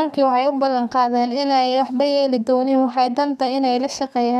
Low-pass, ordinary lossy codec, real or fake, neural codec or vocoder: 14.4 kHz; none; fake; codec, 32 kHz, 1.9 kbps, SNAC